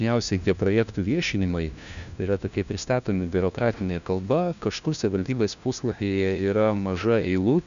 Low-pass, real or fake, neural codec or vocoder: 7.2 kHz; fake; codec, 16 kHz, 1 kbps, FunCodec, trained on LibriTTS, 50 frames a second